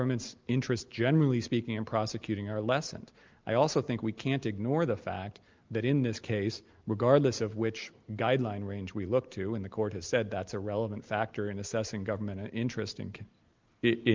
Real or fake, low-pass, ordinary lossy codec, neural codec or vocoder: real; 7.2 kHz; Opus, 32 kbps; none